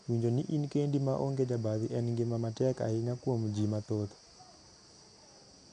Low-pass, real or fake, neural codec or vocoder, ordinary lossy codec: 9.9 kHz; real; none; none